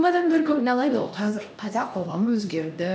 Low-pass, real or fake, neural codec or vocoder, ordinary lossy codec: none; fake; codec, 16 kHz, 1 kbps, X-Codec, HuBERT features, trained on LibriSpeech; none